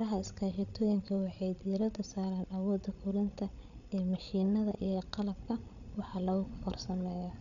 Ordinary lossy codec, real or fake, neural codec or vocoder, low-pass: none; fake; codec, 16 kHz, 8 kbps, FreqCodec, larger model; 7.2 kHz